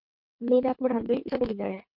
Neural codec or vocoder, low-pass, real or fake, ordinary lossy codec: codec, 24 kHz, 3 kbps, HILCodec; 5.4 kHz; fake; MP3, 48 kbps